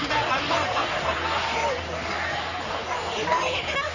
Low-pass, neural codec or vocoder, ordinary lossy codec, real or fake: 7.2 kHz; codec, 44.1 kHz, 3.4 kbps, Pupu-Codec; none; fake